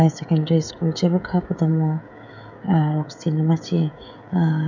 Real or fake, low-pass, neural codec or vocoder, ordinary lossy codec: fake; 7.2 kHz; codec, 16 kHz, 16 kbps, FreqCodec, smaller model; none